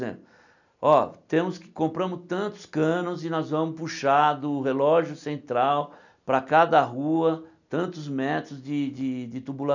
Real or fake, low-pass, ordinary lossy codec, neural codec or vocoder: real; 7.2 kHz; none; none